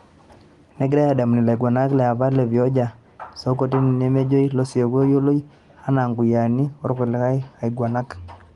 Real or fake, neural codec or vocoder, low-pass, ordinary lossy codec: real; none; 10.8 kHz; Opus, 24 kbps